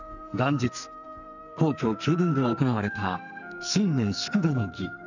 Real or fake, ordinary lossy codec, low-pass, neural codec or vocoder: fake; none; 7.2 kHz; codec, 44.1 kHz, 2.6 kbps, SNAC